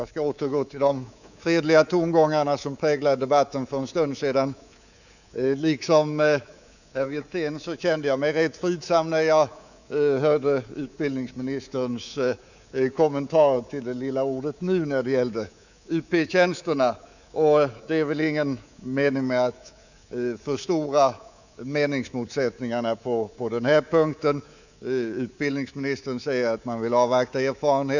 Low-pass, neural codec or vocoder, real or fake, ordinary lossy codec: 7.2 kHz; codec, 24 kHz, 3.1 kbps, DualCodec; fake; none